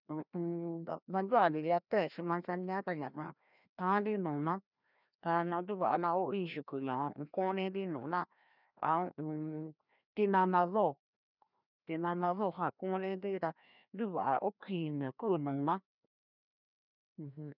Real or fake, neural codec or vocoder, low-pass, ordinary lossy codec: fake; codec, 16 kHz, 1 kbps, FreqCodec, larger model; 5.4 kHz; none